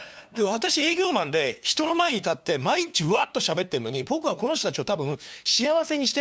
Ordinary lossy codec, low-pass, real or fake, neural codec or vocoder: none; none; fake; codec, 16 kHz, 2 kbps, FunCodec, trained on LibriTTS, 25 frames a second